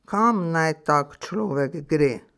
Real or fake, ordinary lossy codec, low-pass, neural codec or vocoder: real; none; none; none